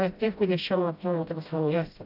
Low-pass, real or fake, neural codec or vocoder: 5.4 kHz; fake; codec, 16 kHz, 0.5 kbps, FreqCodec, smaller model